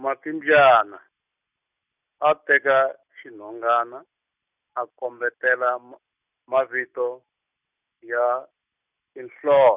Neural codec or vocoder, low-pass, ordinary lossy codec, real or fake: none; 3.6 kHz; none; real